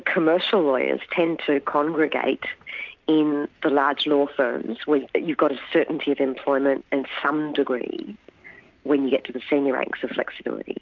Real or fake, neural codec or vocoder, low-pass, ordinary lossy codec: real; none; 7.2 kHz; MP3, 64 kbps